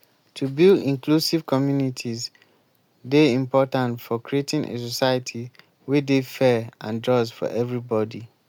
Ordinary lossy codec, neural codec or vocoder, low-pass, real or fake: MP3, 96 kbps; none; 19.8 kHz; real